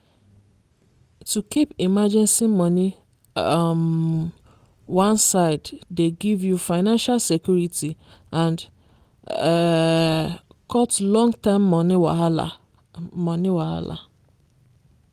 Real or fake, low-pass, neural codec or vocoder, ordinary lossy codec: real; 14.4 kHz; none; Opus, 24 kbps